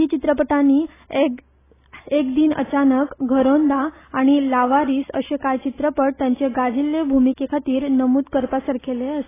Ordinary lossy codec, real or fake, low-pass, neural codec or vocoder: AAC, 16 kbps; real; 3.6 kHz; none